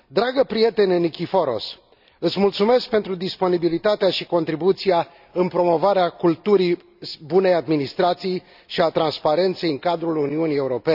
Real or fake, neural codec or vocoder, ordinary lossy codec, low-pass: real; none; none; 5.4 kHz